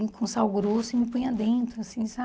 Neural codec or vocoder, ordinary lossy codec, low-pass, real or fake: none; none; none; real